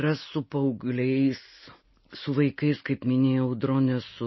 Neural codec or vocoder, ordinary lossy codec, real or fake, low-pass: none; MP3, 24 kbps; real; 7.2 kHz